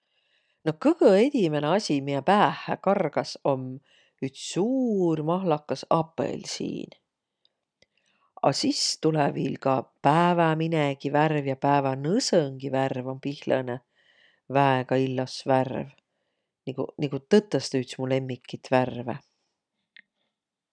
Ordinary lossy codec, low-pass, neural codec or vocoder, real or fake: none; 9.9 kHz; none; real